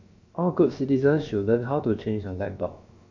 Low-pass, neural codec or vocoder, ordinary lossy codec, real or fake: 7.2 kHz; codec, 16 kHz, about 1 kbps, DyCAST, with the encoder's durations; MP3, 48 kbps; fake